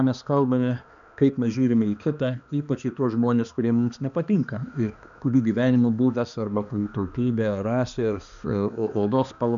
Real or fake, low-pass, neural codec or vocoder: fake; 7.2 kHz; codec, 16 kHz, 2 kbps, X-Codec, HuBERT features, trained on balanced general audio